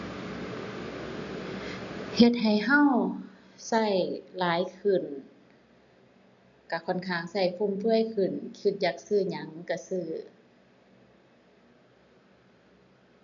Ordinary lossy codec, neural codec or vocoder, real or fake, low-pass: none; none; real; 7.2 kHz